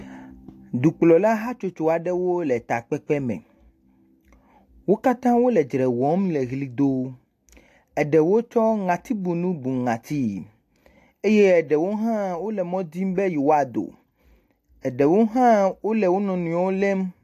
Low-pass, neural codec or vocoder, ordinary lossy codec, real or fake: 14.4 kHz; none; AAC, 64 kbps; real